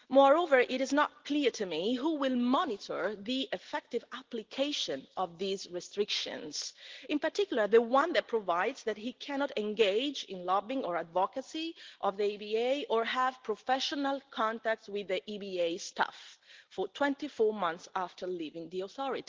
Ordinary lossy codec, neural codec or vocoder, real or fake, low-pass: Opus, 16 kbps; none; real; 7.2 kHz